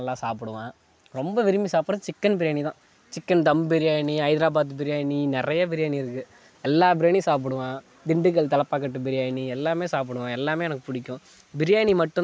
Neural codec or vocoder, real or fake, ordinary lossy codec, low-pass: none; real; none; none